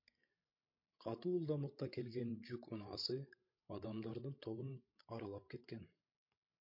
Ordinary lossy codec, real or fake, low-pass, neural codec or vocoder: MP3, 32 kbps; fake; 5.4 kHz; codec, 16 kHz, 16 kbps, FreqCodec, larger model